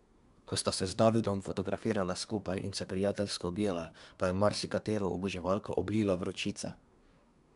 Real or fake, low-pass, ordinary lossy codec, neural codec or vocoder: fake; 10.8 kHz; none; codec, 24 kHz, 1 kbps, SNAC